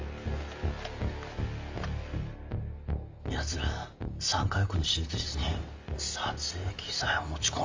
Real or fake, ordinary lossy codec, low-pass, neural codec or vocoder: real; Opus, 32 kbps; 7.2 kHz; none